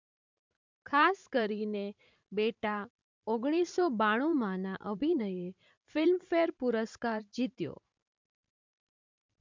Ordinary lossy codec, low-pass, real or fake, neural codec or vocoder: MP3, 64 kbps; 7.2 kHz; fake; vocoder, 44.1 kHz, 128 mel bands every 512 samples, BigVGAN v2